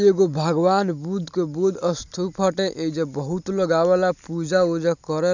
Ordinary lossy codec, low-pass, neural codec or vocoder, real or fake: none; 7.2 kHz; none; real